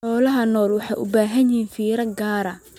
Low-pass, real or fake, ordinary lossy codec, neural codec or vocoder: 19.8 kHz; real; MP3, 96 kbps; none